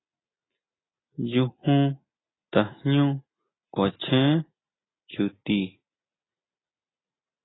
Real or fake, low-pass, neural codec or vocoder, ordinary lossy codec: real; 7.2 kHz; none; AAC, 16 kbps